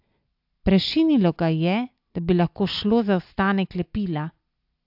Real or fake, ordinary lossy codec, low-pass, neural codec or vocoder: real; AAC, 48 kbps; 5.4 kHz; none